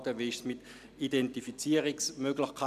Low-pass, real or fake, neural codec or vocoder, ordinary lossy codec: 14.4 kHz; real; none; none